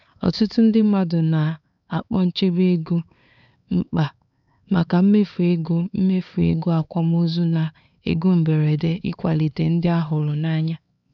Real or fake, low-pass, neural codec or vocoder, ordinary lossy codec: fake; 7.2 kHz; codec, 16 kHz, 4 kbps, X-Codec, HuBERT features, trained on LibriSpeech; none